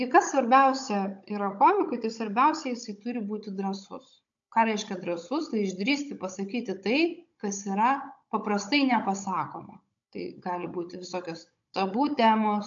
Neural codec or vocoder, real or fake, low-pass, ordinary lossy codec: codec, 16 kHz, 16 kbps, FunCodec, trained on Chinese and English, 50 frames a second; fake; 7.2 kHz; AAC, 64 kbps